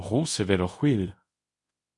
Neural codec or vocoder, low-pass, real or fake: codec, 24 kHz, 0.5 kbps, DualCodec; 10.8 kHz; fake